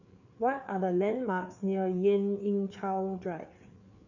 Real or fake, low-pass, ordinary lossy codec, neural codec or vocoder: fake; 7.2 kHz; none; codec, 16 kHz, 4 kbps, FreqCodec, larger model